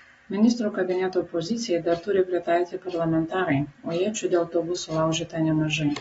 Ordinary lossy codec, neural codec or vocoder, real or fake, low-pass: AAC, 24 kbps; none; real; 19.8 kHz